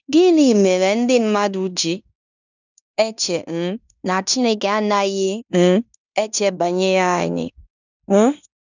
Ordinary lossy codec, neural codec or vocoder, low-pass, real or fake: none; codec, 16 kHz in and 24 kHz out, 0.9 kbps, LongCat-Audio-Codec, fine tuned four codebook decoder; 7.2 kHz; fake